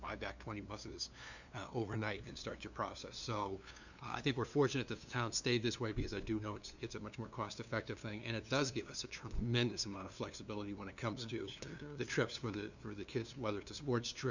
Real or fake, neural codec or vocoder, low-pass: fake; codec, 16 kHz, 2 kbps, FunCodec, trained on LibriTTS, 25 frames a second; 7.2 kHz